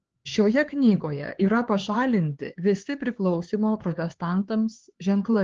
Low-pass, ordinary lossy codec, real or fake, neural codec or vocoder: 7.2 kHz; Opus, 16 kbps; fake; codec, 16 kHz, 4 kbps, X-Codec, HuBERT features, trained on LibriSpeech